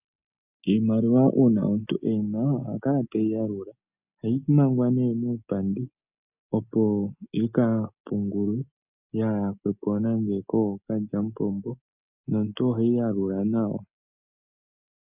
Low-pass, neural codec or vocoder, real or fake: 3.6 kHz; none; real